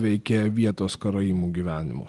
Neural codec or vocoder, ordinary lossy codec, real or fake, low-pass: none; Opus, 24 kbps; real; 10.8 kHz